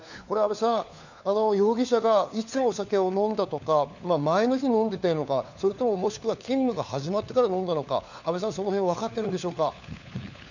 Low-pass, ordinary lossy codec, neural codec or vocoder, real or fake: 7.2 kHz; none; codec, 16 kHz, 4 kbps, FunCodec, trained on LibriTTS, 50 frames a second; fake